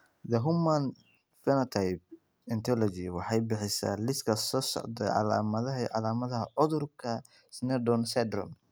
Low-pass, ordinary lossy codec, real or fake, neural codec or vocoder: none; none; real; none